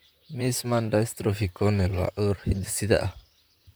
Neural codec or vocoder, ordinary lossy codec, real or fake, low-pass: vocoder, 44.1 kHz, 128 mel bands, Pupu-Vocoder; none; fake; none